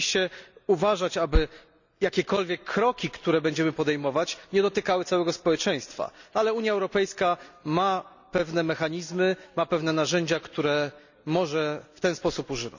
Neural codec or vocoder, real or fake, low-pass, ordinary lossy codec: none; real; 7.2 kHz; none